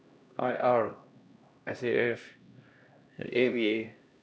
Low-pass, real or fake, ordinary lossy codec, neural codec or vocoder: none; fake; none; codec, 16 kHz, 1 kbps, X-Codec, HuBERT features, trained on LibriSpeech